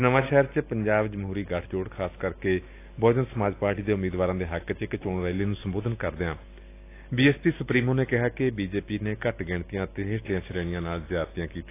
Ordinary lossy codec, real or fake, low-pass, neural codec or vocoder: AAC, 24 kbps; real; 3.6 kHz; none